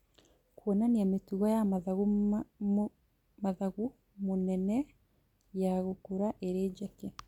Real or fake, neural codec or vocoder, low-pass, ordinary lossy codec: real; none; 19.8 kHz; MP3, 96 kbps